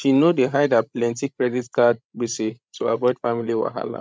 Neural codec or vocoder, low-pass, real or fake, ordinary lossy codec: codec, 16 kHz, 16 kbps, FreqCodec, larger model; none; fake; none